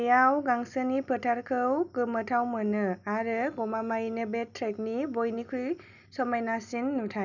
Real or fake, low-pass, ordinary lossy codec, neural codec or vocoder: real; 7.2 kHz; none; none